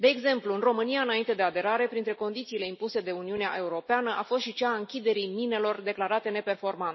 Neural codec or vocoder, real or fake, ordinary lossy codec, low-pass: none; real; MP3, 24 kbps; 7.2 kHz